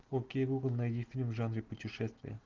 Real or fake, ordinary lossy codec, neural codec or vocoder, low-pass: real; Opus, 32 kbps; none; 7.2 kHz